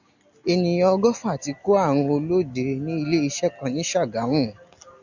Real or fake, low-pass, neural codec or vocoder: real; 7.2 kHz; none